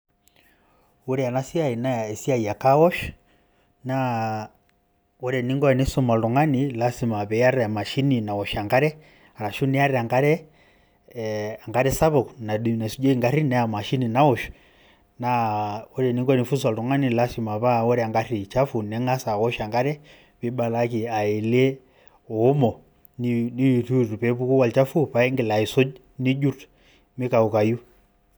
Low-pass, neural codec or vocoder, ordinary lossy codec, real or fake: none; none; none; real